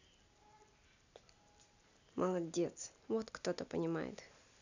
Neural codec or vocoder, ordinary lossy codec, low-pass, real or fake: none; none; 7.2 kHz; real